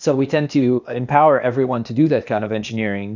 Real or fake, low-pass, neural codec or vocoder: fake; 7.2 kHz; codec, 16 kHz, 0.8 kbps, ZipCodec